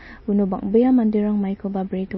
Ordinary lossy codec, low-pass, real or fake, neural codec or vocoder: MP3, 24 kbps; 7.2 kHz; real; none